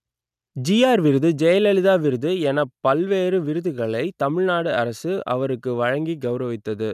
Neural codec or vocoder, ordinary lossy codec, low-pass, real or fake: none; none; 14.4 kHz; real